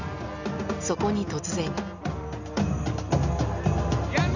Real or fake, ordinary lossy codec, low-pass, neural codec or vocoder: real; none; 7.2 kHz; none